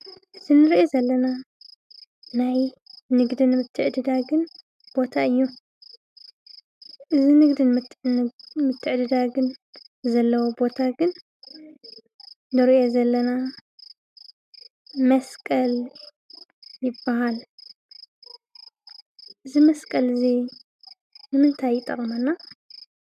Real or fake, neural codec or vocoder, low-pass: real; none; 14.4 kHz